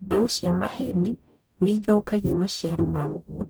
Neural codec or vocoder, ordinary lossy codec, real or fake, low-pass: codec, 44.1 kHz, 0.9 kbps, DAC; none; fake; none